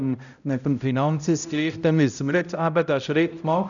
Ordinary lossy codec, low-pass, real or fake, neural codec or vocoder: none; 7.2 kHz; fake; codec, 16 kHz, 0.5 kbps, X-Codec, HuBERT features, trained on balanced general audio